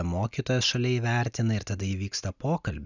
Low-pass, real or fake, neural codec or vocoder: 7.2 kHz; real; none